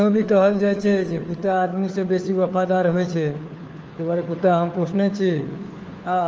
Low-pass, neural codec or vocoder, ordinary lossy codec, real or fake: 7.2 kHz; codec, 16 kHz, 4 kbps, FunCodec, trained on LibriTTS, 50 frames a second; Opus, 24 kbps; fake